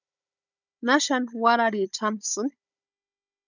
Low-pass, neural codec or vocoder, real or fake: 7.2 kHz; codec, 16 kHz, 16 kbps, FunCodec, trained on Chinese and English, 50 frames a second; fake